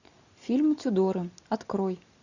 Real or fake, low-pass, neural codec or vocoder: real; 7.2 kHz; none